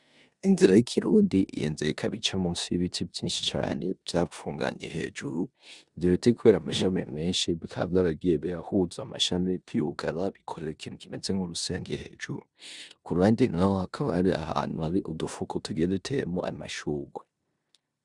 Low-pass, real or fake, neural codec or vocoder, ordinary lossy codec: 10.8 kHz; fake; codec, 16 kHz in and 24 kHz out, 0.9 kbps, LongCat-Audio-Codec, four codebook decoder; Opus, 64 kbps